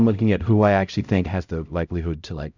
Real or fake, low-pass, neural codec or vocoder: fake; 7.2 kHz; codec, 16 kHz, 0.5 kbps, X-Codec, HuBERT features, trained on LibriSpeech